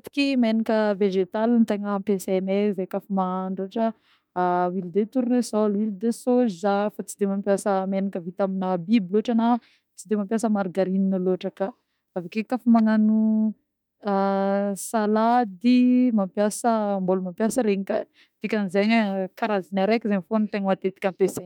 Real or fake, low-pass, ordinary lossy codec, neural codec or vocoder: fake; 19.8 kHz; none; autoencoder, 48 kHz, 32 numbers a frame, DAC-VAE, trained on Japanese speech